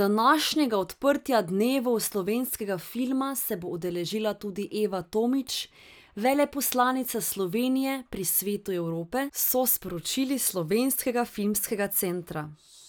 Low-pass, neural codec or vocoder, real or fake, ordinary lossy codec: none; none; real; none